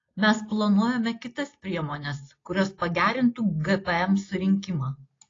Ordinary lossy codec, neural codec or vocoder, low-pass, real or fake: AAC, 32 kbps; none; 7.2 kHz; real